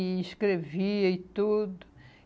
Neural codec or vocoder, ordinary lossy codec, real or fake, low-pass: none; none; real; none